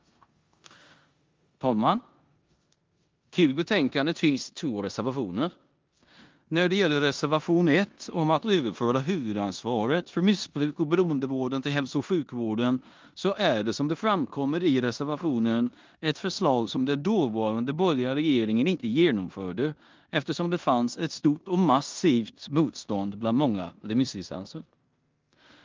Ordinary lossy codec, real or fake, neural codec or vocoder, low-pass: Opus, 32 kbps; fake; codec, 16 kHz in and 24 kHz out, 0.9 kbps, LongCat-Audio-Codec, four codebook decoder; 7.2 kHz